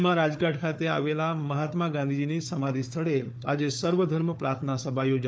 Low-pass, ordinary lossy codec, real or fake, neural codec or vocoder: none; none; fake; codec, 16 kHz, 4 kbps, FunCodec, trained on Chinese and English, 50 frames a second